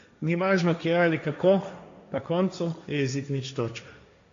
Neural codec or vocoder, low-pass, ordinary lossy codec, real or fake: codec, 16 kHz, 1.1 kbps, Voila-Tokenizer; 7.2 kHz; AAC, 48 kbps; fake